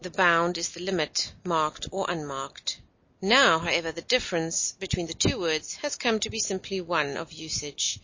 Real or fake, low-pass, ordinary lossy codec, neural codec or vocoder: real; 7.2 kHz; MP3, 32 kbps; none